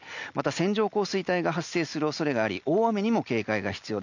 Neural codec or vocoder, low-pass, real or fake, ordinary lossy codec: none; 7.2 kHz; real; none